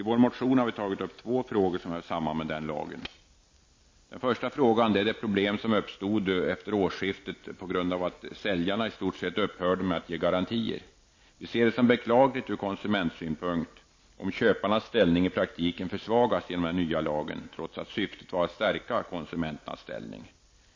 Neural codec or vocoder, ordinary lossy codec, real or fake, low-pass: none; MP3, 32 kbps; real; 7.2 kHz